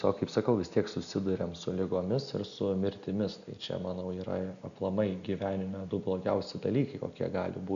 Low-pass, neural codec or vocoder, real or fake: 7.2 kHz; none; real